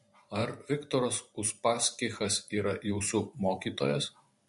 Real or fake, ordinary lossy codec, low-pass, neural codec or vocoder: fake; MP3, 48 kbps; 14.4 kHz; vocoder, 44.1 kHz, 128 mel bands every 512 samples, BigVGAN v2